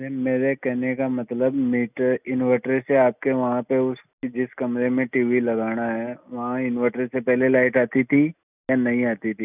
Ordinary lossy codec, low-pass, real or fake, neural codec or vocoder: none; 3.6 kHz; real; none